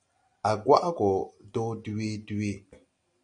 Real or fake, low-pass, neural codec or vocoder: real; 9.9 kHz; none